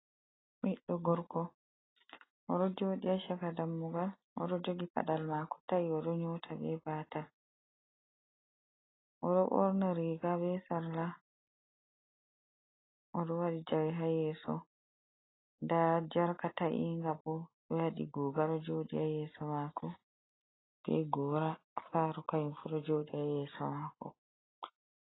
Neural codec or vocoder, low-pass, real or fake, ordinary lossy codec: none; 7.2 kHz; real; AAC, 16 kbps